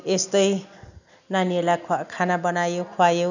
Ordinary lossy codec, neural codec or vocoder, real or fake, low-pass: none; none; real; 7.2 kHz